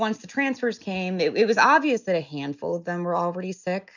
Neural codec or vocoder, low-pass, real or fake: none; 7.2 kHz; real